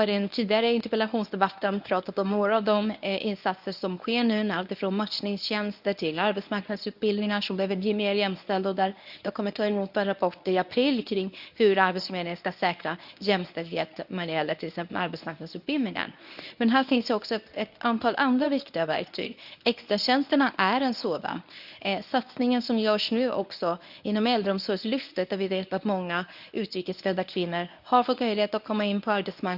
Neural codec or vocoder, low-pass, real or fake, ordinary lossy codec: codec, 24 kHz, 0.9 kbps, WavTokenizer, medium speech release version 1; 5.4 kHz; fake; none